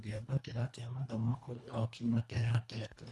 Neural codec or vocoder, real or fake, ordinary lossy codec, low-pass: codec, 24 kHz, 1.5 kbps, HILCodec; fake; none; none